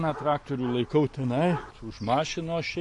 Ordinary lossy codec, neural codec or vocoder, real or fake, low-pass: MP3, 48 kbps; none; real; 10.8 kHz